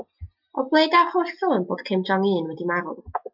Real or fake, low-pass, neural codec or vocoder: real; 5.4 kHz; none